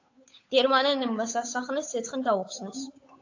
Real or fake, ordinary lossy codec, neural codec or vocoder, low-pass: fake; AAC, 48 kbps; codec, 16 kHz, 8 kbps, FunCodec, trained on Chinese and English, 25 frames a second; 7.2 kHz